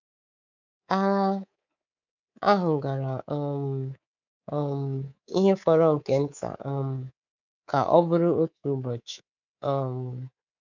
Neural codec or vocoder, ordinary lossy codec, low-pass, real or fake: codec, 24 kHz, 3.1 kbps, DualCodec; none; 7.2 kHz; fake